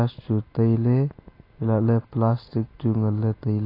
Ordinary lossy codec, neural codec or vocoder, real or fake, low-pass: AAC, 24 kbps; none; real; 5.4 kHz